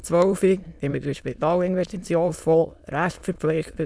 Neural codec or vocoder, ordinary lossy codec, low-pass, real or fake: autoencoder, 22.05 kHz, a latent of 192 numbers a frame, VITS, trained on many speakers; none; none; fake